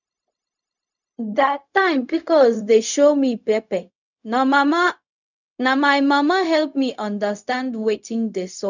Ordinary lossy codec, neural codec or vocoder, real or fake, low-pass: none; codec, 16 kHz, 0.4 kbps, LongCat-Audio-Codec; fake; 7.2 kHz